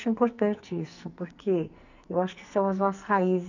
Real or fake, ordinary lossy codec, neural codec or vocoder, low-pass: fake; none; codec, 44.1 kHz, 2.6 kbps, SNAC; 7.2 kHz